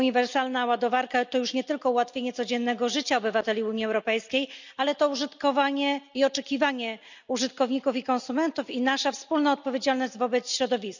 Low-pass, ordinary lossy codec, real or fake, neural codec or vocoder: 7.2 kHz; none; real; none